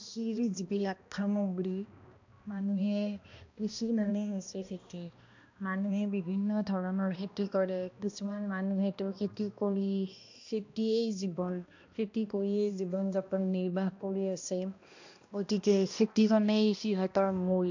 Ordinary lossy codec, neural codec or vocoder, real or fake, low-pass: AAC, 48 kbps; codec, 16 kHz, 1 kbps, X-Codec, HuBERT features, trained on balanced general audio; fake; 7.2 kHz